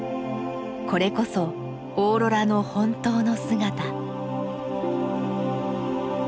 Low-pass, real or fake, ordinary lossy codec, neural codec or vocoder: none; real; none; none